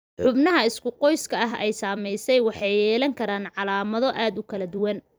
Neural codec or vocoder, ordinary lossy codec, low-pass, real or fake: vocoder, 44.1 kHz, 128 mel bands every 256 samples, BigVGAN v2; none; none; fake